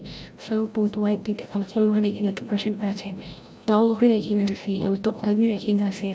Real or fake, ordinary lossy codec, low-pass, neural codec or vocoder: fake; none; none; codec, 16 kHz, 0.5 kbps, FreqCodec, larger model